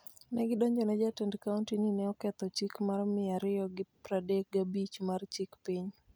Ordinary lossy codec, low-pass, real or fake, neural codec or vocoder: none; none; real; none